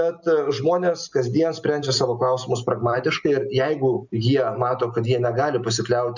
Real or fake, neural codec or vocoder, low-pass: real; none; 7.2 kHz